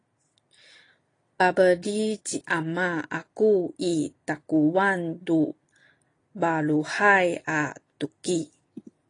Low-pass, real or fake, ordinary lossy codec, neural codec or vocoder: 9.9 kHz; real; AAC, 32 kbps; none